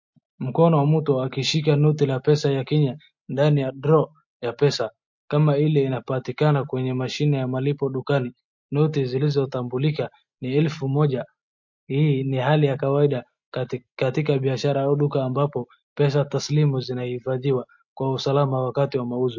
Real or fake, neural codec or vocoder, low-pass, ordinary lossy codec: real; none; 7.2 kHz; MP3, 48 kbps